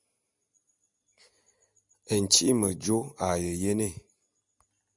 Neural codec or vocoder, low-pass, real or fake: none; 10.8 kHz; real